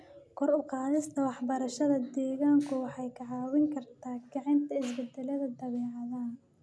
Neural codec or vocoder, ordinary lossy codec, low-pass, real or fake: none; none; none; real